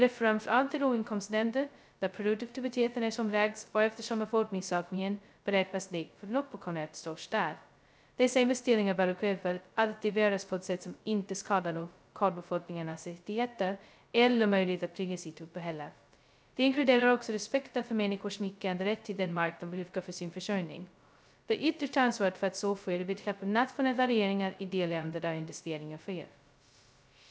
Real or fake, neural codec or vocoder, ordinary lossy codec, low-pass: fake; codec, 16 kHz, 0.2 kbps, FocalCodec; none; none